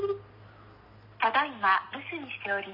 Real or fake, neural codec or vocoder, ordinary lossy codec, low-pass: real; none; none; 5.4 kHz